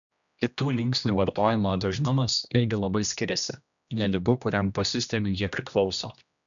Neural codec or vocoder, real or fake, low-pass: codec, 16 kHz, 1 kbps, X-Codec, HuBERT features, trained on general audio; fake; 7.2 kHz